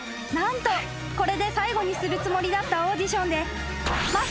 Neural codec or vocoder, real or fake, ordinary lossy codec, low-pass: none; real; none; none